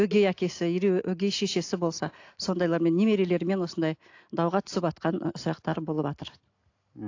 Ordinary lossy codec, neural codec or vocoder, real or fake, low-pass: AAC, 48 kbps; none; real; 7.2 kHz